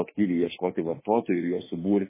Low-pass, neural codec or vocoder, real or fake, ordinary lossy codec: 3.6 kHz; autoencoder, 48 kHz, 32 numbers a frame, DAC-VAE, trained on Japanese speech; fake; MP3, 16 kbps